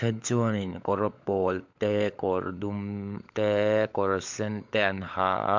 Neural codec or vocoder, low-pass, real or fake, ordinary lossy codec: codec, 16 kHz, 16 kbps, FunCodec, trained on LibriTTS, 50 frames a second; 7.2 kHz; fake; MP3, 64 kbps